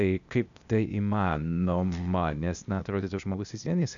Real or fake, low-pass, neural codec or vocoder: fake; 7.2 kHz; codec, 16 kHz, 0.8 kbps, ZipCodec